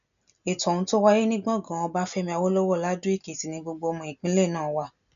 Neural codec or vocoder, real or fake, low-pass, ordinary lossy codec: none; real; 7.2 kHz; none